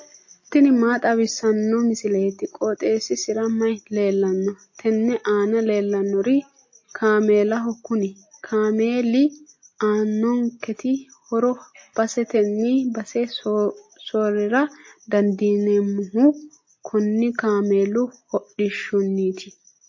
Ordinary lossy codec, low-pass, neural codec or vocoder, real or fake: MP3, 32 kbps; 7.2 kHz; none; real